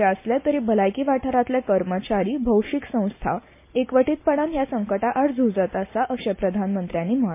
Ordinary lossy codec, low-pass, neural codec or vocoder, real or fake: MP3, 24 kbps; 3.6 kHz; none; real